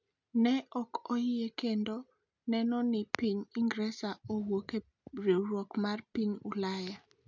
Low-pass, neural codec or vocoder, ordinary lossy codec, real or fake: 7.2 kHz; none; none; real